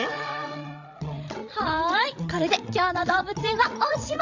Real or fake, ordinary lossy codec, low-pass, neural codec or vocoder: fake; none; 7.2 kHz; codec, 16 kHz, 8 kbps, FreqCodec, larger model